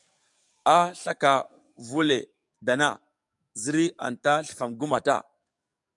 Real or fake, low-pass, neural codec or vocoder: fake; 10.8 kHz; codec, 44.1 kHz, 7.8 kbps, DAC